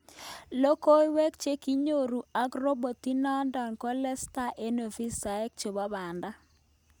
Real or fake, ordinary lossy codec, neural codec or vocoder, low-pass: real; none; none; none